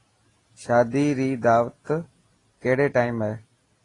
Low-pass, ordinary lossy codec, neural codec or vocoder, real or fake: 10.8 kHz; AAC, 32 kbps; none; real